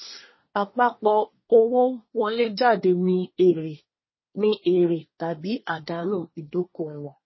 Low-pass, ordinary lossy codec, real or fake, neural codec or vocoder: 7.2 kHz; MP3, 24 kbps; fake; codec, 24 kHz, 1 kbps, SNAC